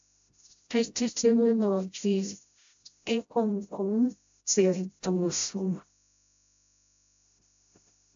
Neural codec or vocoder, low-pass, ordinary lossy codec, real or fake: codec, 16 kHz, 0.5 kbps, FreqCodec, smaller model; 7.2 kHz; AAC, 64 kbps; fake